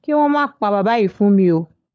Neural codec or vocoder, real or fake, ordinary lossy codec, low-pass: codec, 16 kHz, 16 kbps, FunCodec, trained on LibriTTS, 50 frames a second; fake; none; none